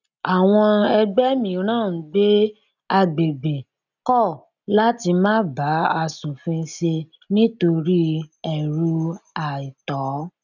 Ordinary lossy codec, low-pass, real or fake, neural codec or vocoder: none; 7.2 kHz; real; none